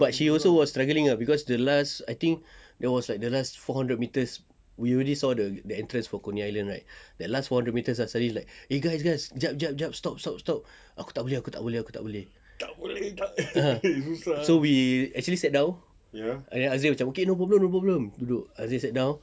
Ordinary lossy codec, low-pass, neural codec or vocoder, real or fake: none; none; none; real